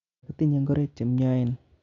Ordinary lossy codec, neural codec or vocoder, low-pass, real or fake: none; none; 7.2 kHz; real